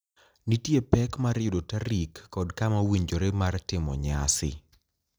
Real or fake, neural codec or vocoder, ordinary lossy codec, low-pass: real; none; none; none